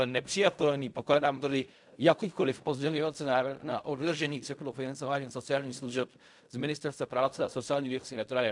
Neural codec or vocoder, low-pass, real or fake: codec, 16 kHz in and 24 kHz out, 0.4 kbps, LongCat-Audio-Codec, fine tuned four codebook decoder; 10.8 kHz; fake